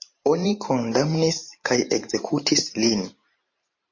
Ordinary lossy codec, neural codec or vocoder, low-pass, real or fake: MP3, 32 kbps; vocoder, 44.1 kHz, 128 mel bands every 512 samples, BigVGAN v2; 7.2 kHz; fake